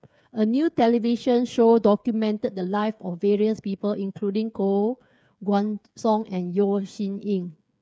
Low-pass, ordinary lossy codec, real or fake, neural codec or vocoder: none; none; fake; codec, 16 kHz, 8 kbps, FreqCodec, smaller model